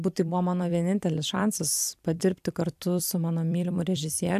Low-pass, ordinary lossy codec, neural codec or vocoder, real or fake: 14.4 kHz; AAC, 96 kbps; vocoder, 44.1 kHz, 128 mel bands every 256 samples, BigVGAN v2; fake